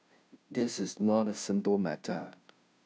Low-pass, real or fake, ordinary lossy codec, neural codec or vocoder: none; fake; none; codec, 16 kHz, 0.5 kbps, FunCodec, trained on Chinese and English, 25 frames a second